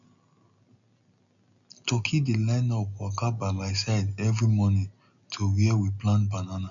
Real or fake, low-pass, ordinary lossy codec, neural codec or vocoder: real; 7.2 kHz; none; none